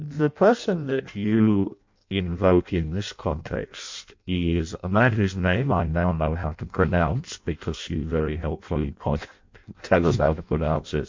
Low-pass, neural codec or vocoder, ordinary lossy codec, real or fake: 7.2 kHz; codec, 16 kHz in and 24 kHz out, 0.6 kbps, FireRedTTS-2 codec; MP3, 48 kbps; fake